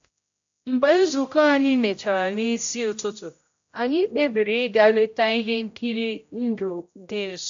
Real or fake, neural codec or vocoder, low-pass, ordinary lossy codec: fake; codec, 16 kHz, 0.5 kbps, X-Codec, HuBERT features, trained on general audio; 7.2 kHz; AAC, 64 kbps